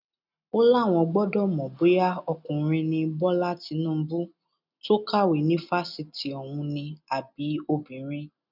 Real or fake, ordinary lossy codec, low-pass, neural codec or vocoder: real; none; 5.4 kHz; none